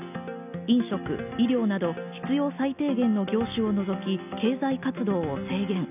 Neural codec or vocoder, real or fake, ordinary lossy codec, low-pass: none; real; none; 3.6 kHz